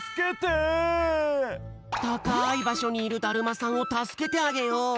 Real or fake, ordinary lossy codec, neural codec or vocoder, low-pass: real; none; none; none